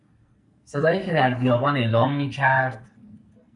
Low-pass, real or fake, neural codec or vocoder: 10.8 kHz; fake; codec, 44.1 kHz, 2.6 kbps, SNAC